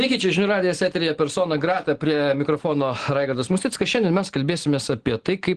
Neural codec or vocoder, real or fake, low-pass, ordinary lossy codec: none; real; 9.9 kHz; Opus, 16 kbps